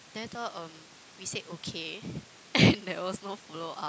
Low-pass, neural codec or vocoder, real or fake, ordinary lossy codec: none; none; real; none